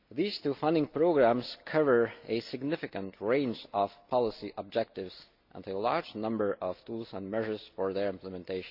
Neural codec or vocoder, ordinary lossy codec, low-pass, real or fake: none; AAC, 48 kbps; 5.4 kHz; real